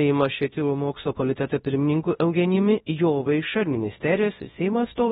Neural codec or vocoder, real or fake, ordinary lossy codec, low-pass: codec, 24 kHz, 0.9 kbps, WavTokenizer, large speech release; fake; AAC, 16 kbps; 10.8 kHz